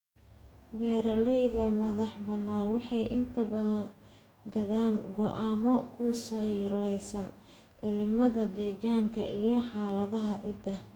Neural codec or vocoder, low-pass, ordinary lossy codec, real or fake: codec, 44.1 kHz, 2.6 kbps, DAC; 19.8 kHz; none; fake